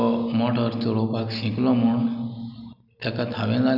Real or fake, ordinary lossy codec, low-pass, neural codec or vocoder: real; none; 5.4 kHz; none